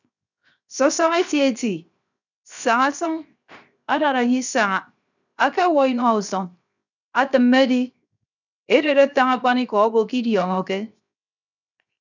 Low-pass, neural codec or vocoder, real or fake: 7.2 kHz; codec, 16 kHz, 0.7 kbps, FocalCodec; fake